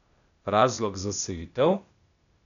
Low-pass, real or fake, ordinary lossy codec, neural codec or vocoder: 7.2 kHz; fake; none; codec, 16 kHz, 0.8 kbps, ZipCodec